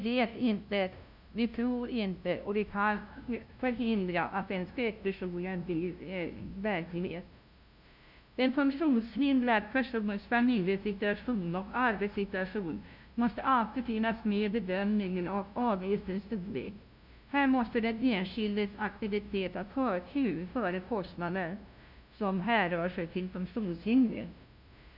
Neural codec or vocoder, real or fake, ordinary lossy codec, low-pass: codec, 16 kHz, 0.5 kbps, FunCodec, trained on LibriTTS, 25 frames a second; fake; none; 5.4 kHz